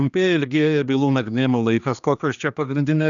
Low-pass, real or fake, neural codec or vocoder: 7.2 kHz; fake; codec, 16 kHz, 2 kbps, X-Codec, HuBERT features, trained on general audio